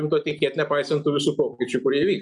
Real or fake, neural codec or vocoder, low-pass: real; none; 10.8 kHz